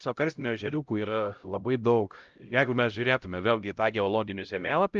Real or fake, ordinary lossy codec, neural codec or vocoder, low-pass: fake; Opus, 32 kbps; codec, 16 kHz, 0.5 kbps, X-Codec, HuBERT features, trained on LibriSpeech; 7.2 kHz